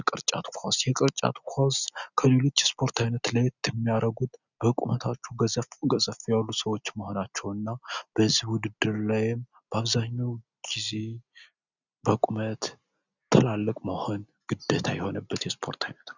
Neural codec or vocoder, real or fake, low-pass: none; real; 7.2 kHz